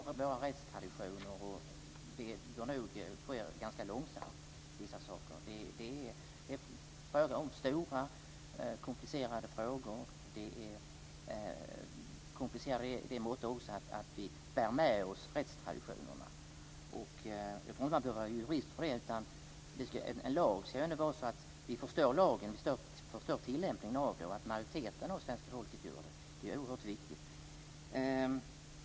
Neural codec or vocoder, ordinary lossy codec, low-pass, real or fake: none; none; none; real